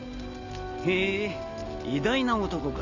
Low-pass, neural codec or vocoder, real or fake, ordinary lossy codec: 7.2 kHz; none; real; none